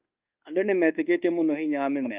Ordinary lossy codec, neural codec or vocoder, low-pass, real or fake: Opus, 32 kbps; codec, 24 kHz, 1.2 kbps, DualCodec; 3.6 kHz; fake